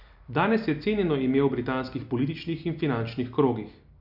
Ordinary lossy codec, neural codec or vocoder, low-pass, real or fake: none; none; 5.4 kHz; real